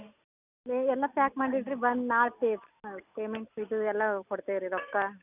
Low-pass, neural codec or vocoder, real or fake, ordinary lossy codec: 3.6 kHz; none; real; none